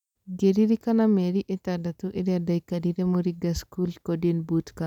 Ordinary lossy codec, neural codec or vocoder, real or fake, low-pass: none; none; real; 19.8 kHz